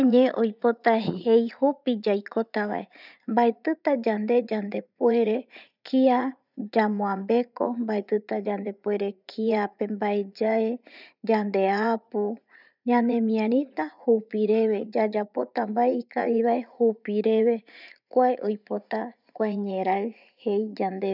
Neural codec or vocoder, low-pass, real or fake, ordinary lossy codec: vocoder, 44.1 kHz, 80 mel bands, Vocos; 5.4 kHz; fake; none